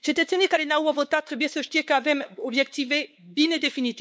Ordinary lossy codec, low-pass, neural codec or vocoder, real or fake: none; none; codec, 16 kHz, 4 kbps, X-Codec, WavLM features, trained on Multilingual LibriSpeech; fake